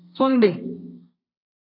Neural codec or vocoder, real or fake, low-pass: codec, 32 kHz, 1.9 kbps, SNAC; fake; 5.4 kHz